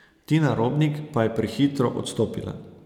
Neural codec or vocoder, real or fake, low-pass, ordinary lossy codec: none; real; 19.8 kHz; none